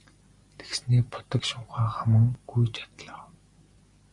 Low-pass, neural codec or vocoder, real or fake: 10.8 kHz; none; real